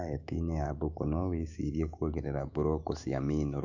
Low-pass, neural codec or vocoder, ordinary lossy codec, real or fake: 7.2 kHz; autoencoder, 48 kHz, 128 numbers a frame, DAC-VAE, trained on Japanese speech; none; fake